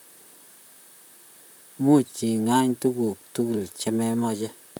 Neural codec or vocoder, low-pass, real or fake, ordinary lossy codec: vocoder, 44.1 kHz, 128 mel bands, Pupu-Vocoder; none; fake; none